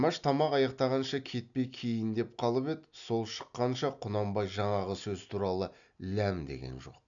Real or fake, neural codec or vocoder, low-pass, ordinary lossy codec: real; none; 7.2 kHz; none